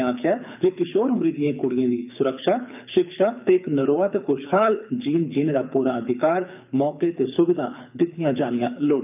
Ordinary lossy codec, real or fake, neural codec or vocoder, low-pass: none; fake; codec, 24 kHz, 6 kbps, HILCodec; 3.6 kHz